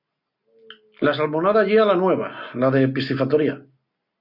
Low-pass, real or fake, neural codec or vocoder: 5.4 kHz; real; none